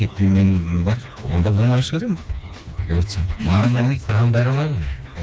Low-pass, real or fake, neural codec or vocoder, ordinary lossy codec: none; fake; codec, 16 kHz, 2 kbps, FreqCodec, smaller model; none